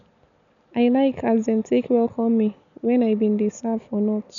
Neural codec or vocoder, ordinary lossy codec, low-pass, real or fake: none; none; 7.2 kHz; real